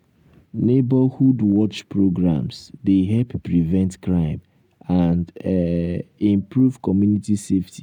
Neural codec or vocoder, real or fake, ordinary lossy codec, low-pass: none; real; none; 19.8 kHz